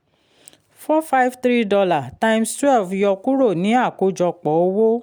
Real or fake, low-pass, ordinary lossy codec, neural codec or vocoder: real; none; none; none